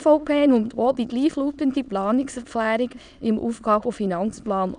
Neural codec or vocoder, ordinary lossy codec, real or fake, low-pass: autoencoder, 22.05 kHz, a latent of 192 numbers a frame, VITS, trained on many speakers; none; fake; 9.9 kHz